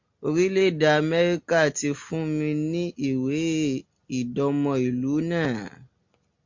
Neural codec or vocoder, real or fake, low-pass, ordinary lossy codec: none; real; 7.2 kHz; MP3, 48 kbps